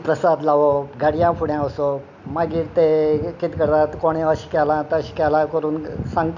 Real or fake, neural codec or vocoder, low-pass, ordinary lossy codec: real; none; 7.2 kHz; none